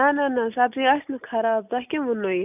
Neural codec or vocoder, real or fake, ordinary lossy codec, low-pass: none; real; none; 3.6 kHz